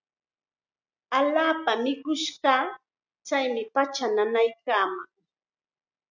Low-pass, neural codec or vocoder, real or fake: 7.2 kHz; none; real